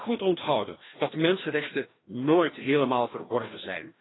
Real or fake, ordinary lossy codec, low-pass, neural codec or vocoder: fake; AAC, 16 kbps; 7.2 kHz; codec, 16 kHz, 1 kbps, FreqCodec, larger model